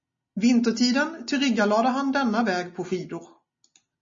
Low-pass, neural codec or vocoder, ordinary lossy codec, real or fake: 7.2 kHz; none; MP3, 32 kbps; real